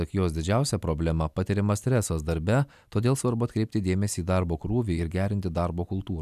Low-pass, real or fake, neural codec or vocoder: 14.4 kHz; real; none